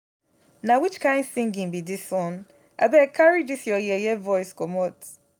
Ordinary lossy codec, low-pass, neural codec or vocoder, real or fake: none; none; none; real